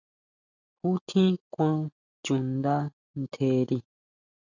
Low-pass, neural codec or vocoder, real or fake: 7.2 kHz; none; real